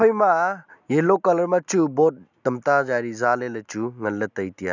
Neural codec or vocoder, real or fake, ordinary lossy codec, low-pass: none; real; none; 7.2 kHz